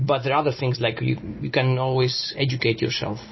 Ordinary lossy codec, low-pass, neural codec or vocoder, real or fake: MP3, 24 kbps; 7.2 kHz; none; real